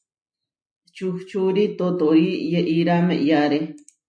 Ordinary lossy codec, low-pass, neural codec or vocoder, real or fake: MP3, 64 kbps; 9.9 kHz; none; real